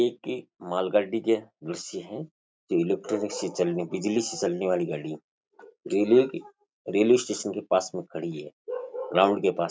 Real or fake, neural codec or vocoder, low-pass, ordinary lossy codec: real; none; none; none